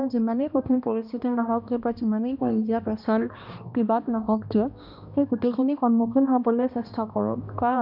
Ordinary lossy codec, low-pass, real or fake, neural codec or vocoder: none; 5.4 kHz; fake; codec, 16 kHz, 1 kbps, X-Codec, HuBERT features, trained on balanced general audio